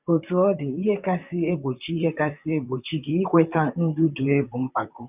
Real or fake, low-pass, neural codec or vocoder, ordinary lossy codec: fake; 3.6 kHz; vocoder, 44.1 kHz, 128 mel bands, Pupu-Vocoder; none